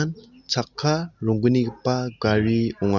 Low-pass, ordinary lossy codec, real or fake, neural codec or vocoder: 7.2 kHz; none; real; none